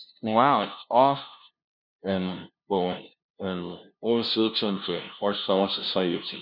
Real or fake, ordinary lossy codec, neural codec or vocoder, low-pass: fake; none; codec, 16 kHz, 0.5 kbps, FunCodec, trained on LibriTTS, 25 frames a second; 5.4 kHz